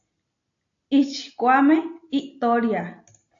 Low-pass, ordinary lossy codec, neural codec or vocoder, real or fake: 7.2 kHz; AAC, 48 kbps; none; real